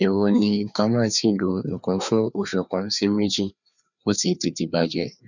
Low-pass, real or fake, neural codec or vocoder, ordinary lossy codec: 7.2 kHz; fake; codec, 16 kHz, 2 kbps, FreqCodec, larger model; none